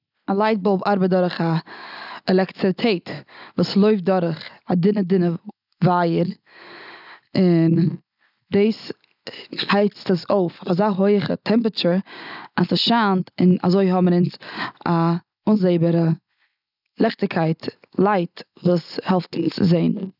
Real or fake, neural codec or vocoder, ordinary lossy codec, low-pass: real; none; none; 5.4 kHz